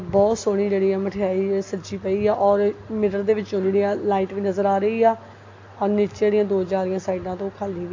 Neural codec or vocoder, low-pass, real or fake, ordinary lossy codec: none; 7.2 kHz; real; AAC, 48 kbps